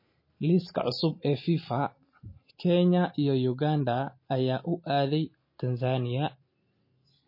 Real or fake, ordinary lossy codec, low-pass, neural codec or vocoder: real; MP3, 24 kbps; 5.4 kHz; none